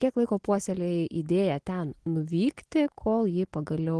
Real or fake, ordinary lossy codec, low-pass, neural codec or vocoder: real; Opus, 16 kbps; 10.8 kHz; none